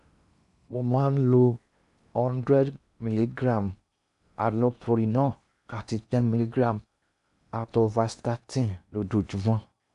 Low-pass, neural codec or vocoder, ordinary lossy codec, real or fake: 10.8 kHz; codec, 16 kHz in and 24 kHz out, 0.8 kbps, FocalCodec, streaming, 65536 codes; none; fake